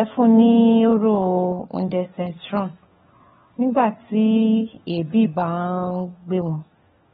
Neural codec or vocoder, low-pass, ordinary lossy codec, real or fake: codec, 16 kHz, 4 kbps, FunCodec, trained on LibriTTS, 50 frames a second; 7.2 kHz; AAC, 16 kbps; fake